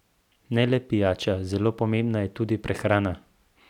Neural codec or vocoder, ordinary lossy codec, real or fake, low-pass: none; none; real; 19.8 kHz